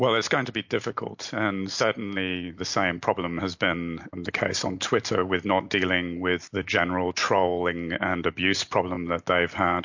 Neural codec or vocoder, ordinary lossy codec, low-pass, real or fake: none; MP3, 48 kbps; 7.2 kHz; real